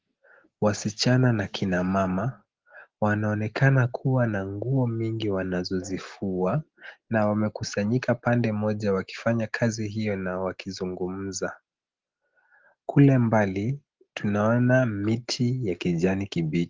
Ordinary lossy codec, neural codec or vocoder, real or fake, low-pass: Opus, 16 kbps; none; real; 7.2 kHz